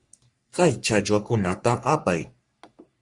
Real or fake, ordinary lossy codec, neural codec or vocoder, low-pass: fake; Opus, 64 kbps; codec, 44.1 kHz, 3.4 kbps, Pupu-Codec; 10.8 kHz